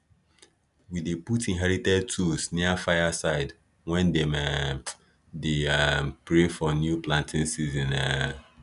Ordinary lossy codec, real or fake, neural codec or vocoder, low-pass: none; real; none; 10.8 kHz